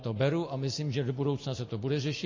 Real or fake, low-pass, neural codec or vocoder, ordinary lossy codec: real; 7.2 kHz; none; MP3, 32 kbps